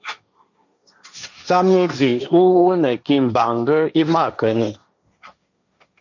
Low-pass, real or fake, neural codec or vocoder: 7.2 kHz; fake; codec, 16 kHz, 1.1 kbps, Voila-Tokenizer